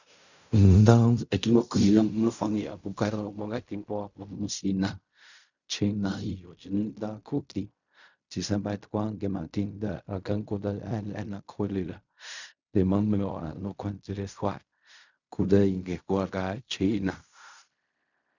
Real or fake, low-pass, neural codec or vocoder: fake; 7.2 kHz; codec, 16 kHz in and 24 kHz out, 0.4 kbps, LongCat-Audio-Codec, fine tuned four codebook decoder